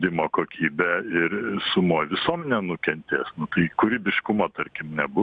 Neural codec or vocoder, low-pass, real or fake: none; 9.9 kHz; real